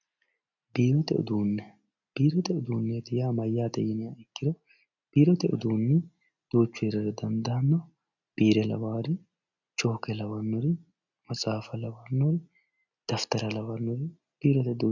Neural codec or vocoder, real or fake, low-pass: none; real; 7.2 kHz